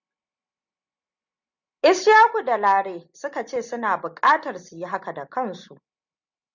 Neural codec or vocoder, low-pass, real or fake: none; 7.2 kHz; real